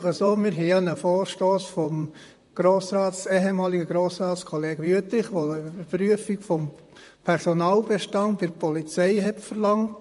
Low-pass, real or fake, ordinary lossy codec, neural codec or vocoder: 14.4 kHz; fake; MP3, 48 kbps; vocoder, 44.1 kHz, 128 mel bands, Pupu-Vocoder